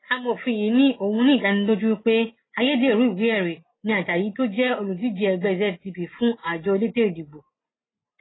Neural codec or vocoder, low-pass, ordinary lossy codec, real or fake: none; 7.2 kHz; AAC, 16 kbps; real